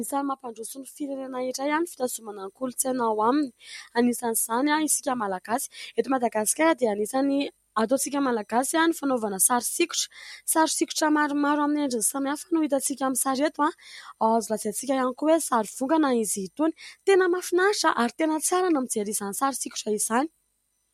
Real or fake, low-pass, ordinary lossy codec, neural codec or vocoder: real; 19.8 kHz; MP3, 64 kbps; none